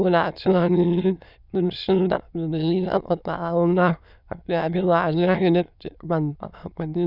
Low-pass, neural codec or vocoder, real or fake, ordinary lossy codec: 5.4 kHz; autoencoder, 22.05 kHz, a latent of 192 numbers a frame, VITS, trained on many speakers; fake; none